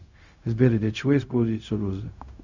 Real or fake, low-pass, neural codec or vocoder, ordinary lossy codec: fake; 7.2 kHz; codec, 16 kHz, 0.4 kbps, LongCat-Audio-Codec; Opus, 64 kbps